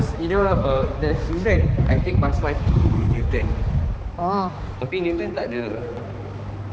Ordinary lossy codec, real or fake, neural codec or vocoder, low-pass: none; fake; codec, 16 kHz, 4 kbps, X-Codec, HuBERT features, trained on balanced general audio; none